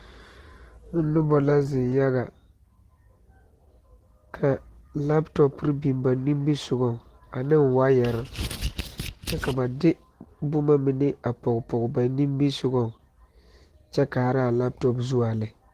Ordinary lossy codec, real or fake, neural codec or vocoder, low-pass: Opus, 16 kbps; real; none; 14.4 kHz